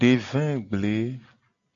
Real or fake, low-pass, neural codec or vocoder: real; 7.2 kHz; none